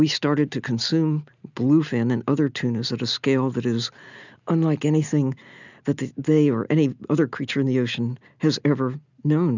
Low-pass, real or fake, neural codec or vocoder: 7.2 kHz; real; none